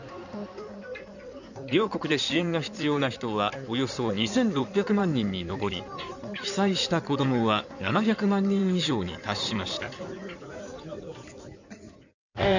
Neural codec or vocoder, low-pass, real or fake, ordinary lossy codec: codec, 16 kHz in and 24 kHz out, 2.2 kbps, FireRedTTS-2 codec; 7.2 kHz; fake; none